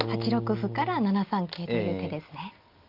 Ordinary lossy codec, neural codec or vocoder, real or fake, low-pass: Opus, 24 kbps; none; real; 5.4 kHz